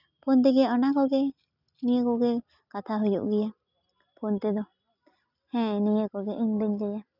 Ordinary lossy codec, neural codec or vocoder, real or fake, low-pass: none; none; real; 5.4 kHz